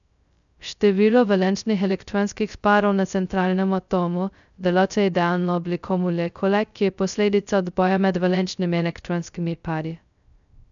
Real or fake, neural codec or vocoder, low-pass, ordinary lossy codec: fake; codec, 16 kHz, 0.2 kbps, FocalCodec; 7.2 kHz; none